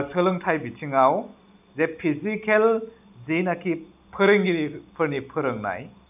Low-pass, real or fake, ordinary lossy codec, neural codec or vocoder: 3.6 kHz; real; none; none